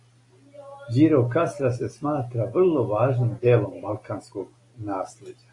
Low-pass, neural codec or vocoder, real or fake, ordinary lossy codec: 10.8 kHz; none; real; AAC, 48 kbps